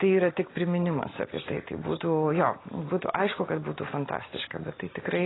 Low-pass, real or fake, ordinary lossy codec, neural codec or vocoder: 7.2 kHz; real; AAC, 16 kbps; none